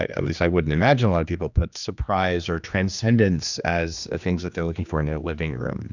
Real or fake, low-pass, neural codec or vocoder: fake; 7.2 kHz; codec, 16 kHz, 2 kbps, X-Codec, HuBERT features, trained on general audio